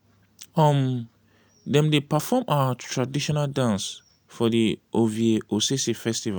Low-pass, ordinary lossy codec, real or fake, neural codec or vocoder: none; none; real; none